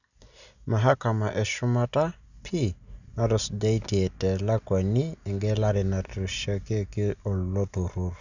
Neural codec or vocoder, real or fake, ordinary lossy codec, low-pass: none; real; none; 7.2 kHz